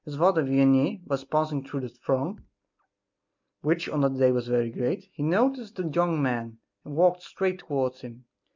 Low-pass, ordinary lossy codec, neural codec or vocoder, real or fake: 7.2 kHz; MP3, 64 kbps; none; real